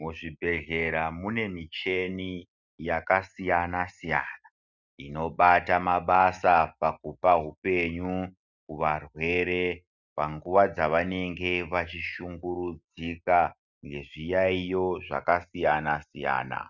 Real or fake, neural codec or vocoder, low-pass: real; none; 7.2 kHz